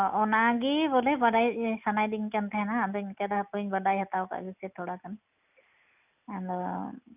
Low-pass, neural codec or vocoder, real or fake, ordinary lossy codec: 3.6 kHz; none; real; none